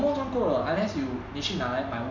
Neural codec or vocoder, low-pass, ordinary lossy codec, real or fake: none; 7.2 kHz; none; real